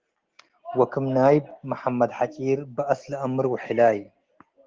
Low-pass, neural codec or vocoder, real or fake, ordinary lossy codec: 7.2 kHz; none; real; Opus, 16 kbps